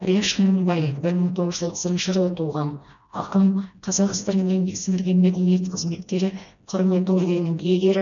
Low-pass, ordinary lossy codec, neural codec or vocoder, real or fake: 7.2 kHz; none; codec, 16 kHz, 1 kbps, FreqCodec, smaller model; fake